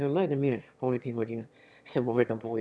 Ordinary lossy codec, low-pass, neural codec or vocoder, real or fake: none; none; autoencoder, 22.05 kHz, a latent of 192 numbers a frame, VITS, trained on one speaker; fake